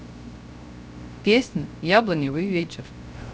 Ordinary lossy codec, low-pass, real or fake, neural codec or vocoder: none; none; fake; codec, 16 kHz, 0.3 kbps, FocalCodec